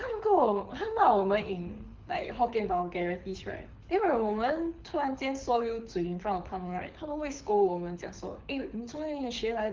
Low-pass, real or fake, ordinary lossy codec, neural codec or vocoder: 7.2 kHz; fake; Opus, 32 kbps; codec, 24 kHz, 6 kbps, HILCodec